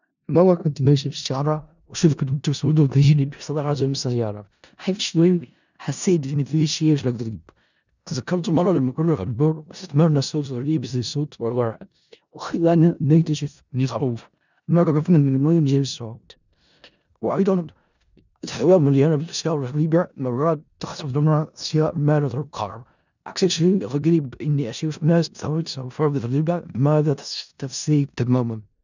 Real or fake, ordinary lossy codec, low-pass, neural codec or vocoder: fake; none; 7.2 kHz; codec, 16 kHz in and 24 kHz out, 0.4 kbps, LongCat-Audio-Codec, four codebook decoder